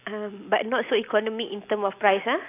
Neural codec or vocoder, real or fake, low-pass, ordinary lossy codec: none; real; 3.6 kHz; AAC, 24 kbps